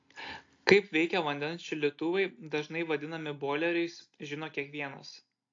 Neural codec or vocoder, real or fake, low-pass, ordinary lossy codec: none; real; 7.2 kHz; AAC, 48 kbps